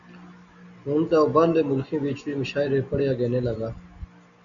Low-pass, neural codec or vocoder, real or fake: 7.2 kHz; none; real